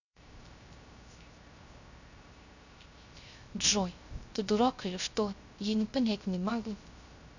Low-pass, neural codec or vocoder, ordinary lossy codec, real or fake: 7.2 kHz; codec, 16 kHz, 0.3 kbps, FocalCodec; none; fake